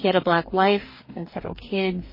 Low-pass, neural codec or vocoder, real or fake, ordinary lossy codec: 5.4 kHz; codec, 44.1 kHz, 2.6 kbps, DAC; fake; MP3, 24 kbps